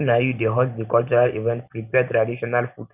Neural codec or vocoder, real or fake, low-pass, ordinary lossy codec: none; real; 3.6 kHz; none